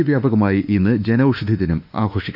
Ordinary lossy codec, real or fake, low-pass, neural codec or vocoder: none; fake; 5.4 kHz; autoencoder, 48 kHz, 32 numbers a frame, DAC-VAE, trained on Japanese speech